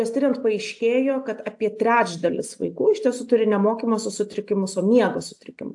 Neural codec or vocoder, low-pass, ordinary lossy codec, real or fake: none; 10.8 kHz; AAC, 64 kbps; real